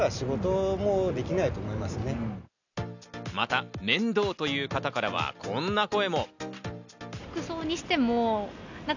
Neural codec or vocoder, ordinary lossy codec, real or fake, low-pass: none; none; real; 7.2 kHz